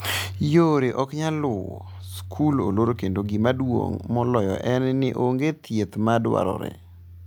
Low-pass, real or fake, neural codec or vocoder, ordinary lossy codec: none; real; none; none